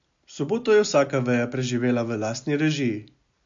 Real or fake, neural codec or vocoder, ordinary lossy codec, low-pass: real; none; MP3, 48 kbps; 7.2 kHz